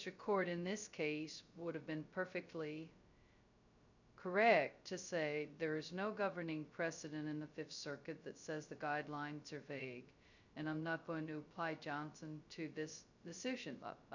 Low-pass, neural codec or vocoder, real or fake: 7.2 kHz; codec, 16 kHz, 0.2 kbps, FocalCodec; fake